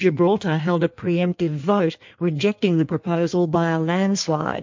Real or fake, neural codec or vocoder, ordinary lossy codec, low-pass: fake; codec, 16 kHz in and 24 kHz out, 1.1 kbps, FireRedTTS-2 codec; MP3, 48 kbps; 7.2 kHz